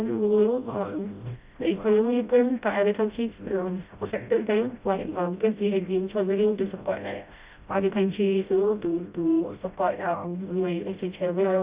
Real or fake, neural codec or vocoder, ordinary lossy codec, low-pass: fake; codec, 16 kHz, 0.5 kbps, FreqCodec, smaller model; Opus, 64 kbps; 3.6 kHz